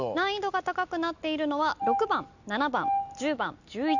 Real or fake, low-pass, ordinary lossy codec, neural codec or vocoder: real; 7.2 kHz; none; none